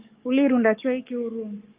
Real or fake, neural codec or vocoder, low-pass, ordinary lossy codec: fake; codec, 44.1 kHz, 7.8 kbps, DAC; 3.6 kHz; Opus, 24 kbps